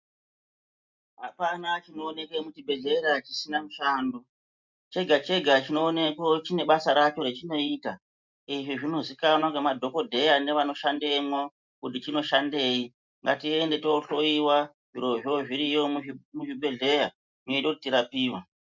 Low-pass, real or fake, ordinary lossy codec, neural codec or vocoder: 7.2 kHz; real; MP3, 64 kbps; none